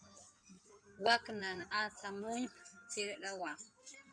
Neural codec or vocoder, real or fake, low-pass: codec, 16 kHz in and 24 kHz out, 2.2 kbps, FireRedTTS-2 codec; fake; 9.9 kHz